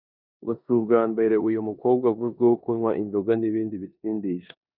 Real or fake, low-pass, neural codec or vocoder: fake; 5.4 kHz; codec, 16 kHz in and 24 kHz out, 0.9 kbps, LongCat-Audio-Codec, four codebook decoder